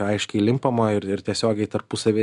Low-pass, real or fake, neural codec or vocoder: 10.8 kHz; real; none